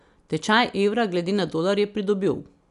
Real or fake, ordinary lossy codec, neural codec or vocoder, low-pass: real; AAC, 96 kbps; none; 10.8 kHz